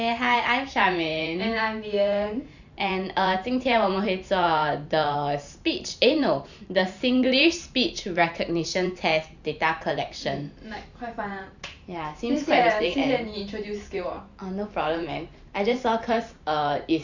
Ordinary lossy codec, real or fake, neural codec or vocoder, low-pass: none; fake; vocoder, 44.1 kHz, 128 mel bands every 512 samples, BigVGAN v2; 7.2 kHz